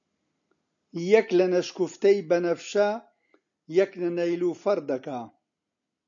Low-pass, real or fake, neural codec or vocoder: 7.2 kHz; real; none